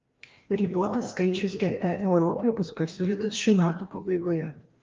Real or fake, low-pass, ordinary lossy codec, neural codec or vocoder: fake; 7.2 kHz; Opus, 32 kbps; codec, 16 kHz, 1 kbps, FreqCodec, larger model